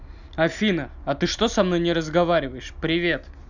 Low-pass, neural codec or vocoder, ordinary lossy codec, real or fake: 7.2 kHz; none; none; real